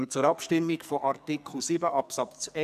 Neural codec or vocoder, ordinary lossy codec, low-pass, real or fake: codec, 44.1 kHz, 2.6 kbps, SNAC; none; 14.4 kHz; fake